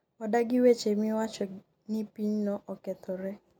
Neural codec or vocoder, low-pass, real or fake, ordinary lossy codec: none; 19.8 kHz; real; none